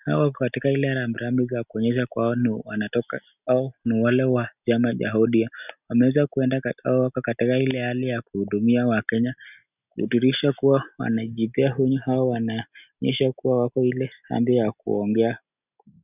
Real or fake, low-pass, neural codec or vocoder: real; 3.6 kHz; none